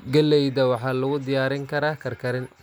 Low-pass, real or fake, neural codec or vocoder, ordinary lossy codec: none; real; none; none